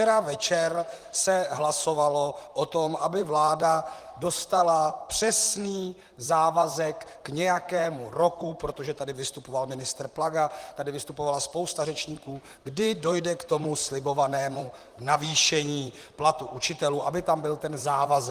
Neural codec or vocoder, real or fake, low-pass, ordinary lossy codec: vocoder, 44.1 kHz, 128 mel bands, Pupu-Vocoder; fake; 14.4 kHz; Opus, 24 kbps